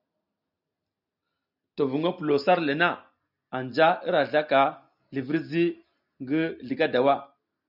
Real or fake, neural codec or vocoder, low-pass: real; none; 5.4 kHz